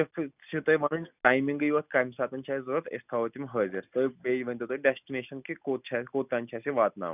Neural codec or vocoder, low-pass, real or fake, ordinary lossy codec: none; 3.6 kHz; real; none